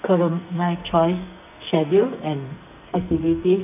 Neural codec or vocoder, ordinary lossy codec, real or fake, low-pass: codec, 44.1 kHz, 2.6 kbps, SNAC; none; fake; 3.6 kHz